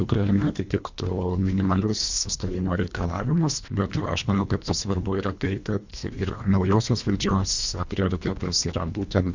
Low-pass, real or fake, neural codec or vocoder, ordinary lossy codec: 7.2 kHz; fake; codec, 24 kHz, 1.5 kbps, HILCodec; Opus, 64 kbps